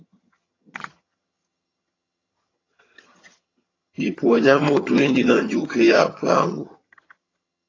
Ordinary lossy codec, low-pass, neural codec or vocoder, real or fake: AAC, 32 kbps; 7.2 kHz; vocoder, 22.05 kHz, 80 mel bands, HiFi-GAN; fake